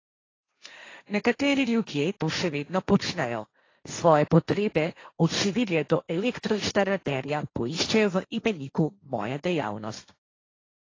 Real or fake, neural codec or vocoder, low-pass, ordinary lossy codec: fake; codec, 16 kHz, 1.1 kbps, Voila-Tokenizer; 7.2 kHz; AAC, 32 kbps